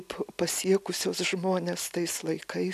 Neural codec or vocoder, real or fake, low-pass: none; real; 14.4 kHz